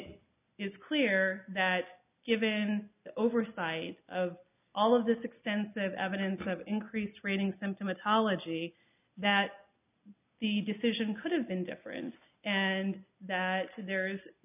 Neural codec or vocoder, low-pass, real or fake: none; 3.6 kHz; real